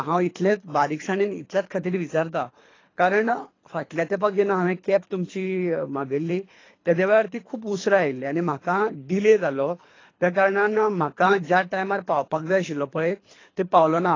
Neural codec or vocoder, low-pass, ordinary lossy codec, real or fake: codec, 24 kHz, 3 kbps, HILCodec; 7.2 kHz; AAC, 32 kbps; fake